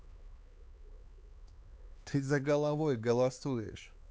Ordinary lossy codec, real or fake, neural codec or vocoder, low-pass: none; fake; codec, 16 kHz, 4 kbps, X-Codec, HuBERT features, trained on LibriSpeech; none